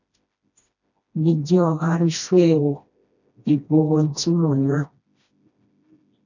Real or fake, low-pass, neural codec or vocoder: fake; 7.2 kHz; codec, 16 kHz, 1 kbps, FreqCodec, smaller model